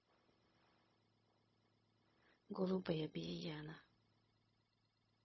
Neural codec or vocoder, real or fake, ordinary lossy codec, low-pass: codec, 16 kHz, 0.4 kbps, LongCat-Audio-Codec; fake; MP3, 24 kbps; 7.2 kHz